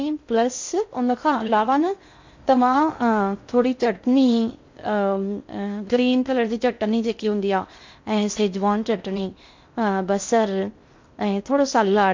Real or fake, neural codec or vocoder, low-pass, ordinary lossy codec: fake; codec, 16 kHz in and 24 kHz out, 0.6 kbps, FocalCodec, streaming, 2048 codes; 7.2 kHz; MP3, 48 kbps